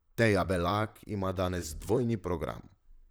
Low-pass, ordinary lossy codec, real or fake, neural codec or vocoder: none; none; fake; vocoder, 44.1 kHz, 128 mel bands, Pupu-Vocoder